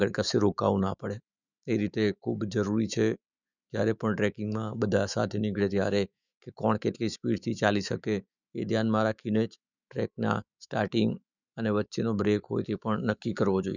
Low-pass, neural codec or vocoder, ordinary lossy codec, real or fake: 7.2 kHz; autoencoder, 48 kHz, 128 numbers a frame, DAC-VAE, trained on Japanese speech; none; fake